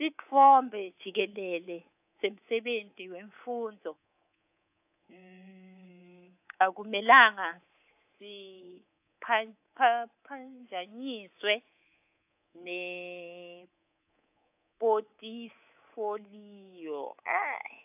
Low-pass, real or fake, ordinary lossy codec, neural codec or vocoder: 3.6 kHz; fake; AAC, 32 kbps; codec, 24 kHz, 3.1 kbps, DualCodec